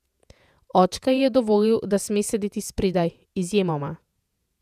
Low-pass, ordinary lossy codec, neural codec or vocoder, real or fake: 14.4 kHz; none; vocoder, 48 kHz, 128 mel bands, Vocos; fake